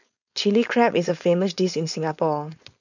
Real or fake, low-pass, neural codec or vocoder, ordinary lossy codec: fake; 7.2 kHz; codec, 16 kHz, 4.8 kbps, FACodec; none